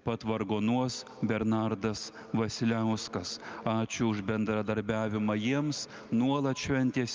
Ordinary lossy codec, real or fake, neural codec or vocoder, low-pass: Opus, 24 kbps; real; none; 7.2 kHz